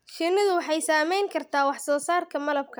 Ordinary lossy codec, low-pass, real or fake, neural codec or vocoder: none; none; real; none